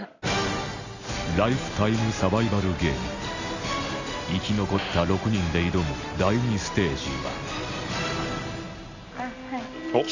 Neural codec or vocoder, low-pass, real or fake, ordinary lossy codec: none; 7.2 kHz; real; none